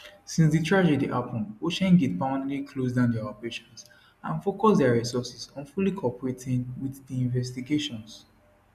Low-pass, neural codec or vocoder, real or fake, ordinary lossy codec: 14.4 kHz; none; real; none